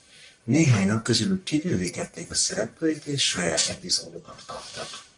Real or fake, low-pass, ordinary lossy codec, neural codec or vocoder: fake; 10.8 kHz; MP3, 96 kbps; codec, 44.1 kHz, 1.7 kbps, Pupu-Codec